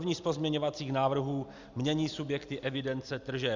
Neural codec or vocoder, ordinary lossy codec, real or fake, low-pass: none; Opus, 64 kbps; real; 7.2 kHz